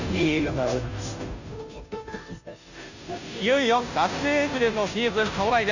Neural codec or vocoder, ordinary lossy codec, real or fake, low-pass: codec, 16 kHz, 0.5 kbps, FunCodec, trained on Chinese and English, 25 frames a second; none; fake; 7.2 kHz